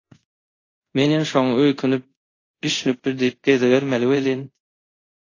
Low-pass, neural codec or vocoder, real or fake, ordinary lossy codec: 7.2 kHz; codec, 24 kHz, 0.5 kbps, DualCodec; fake; AAC, 32 kbps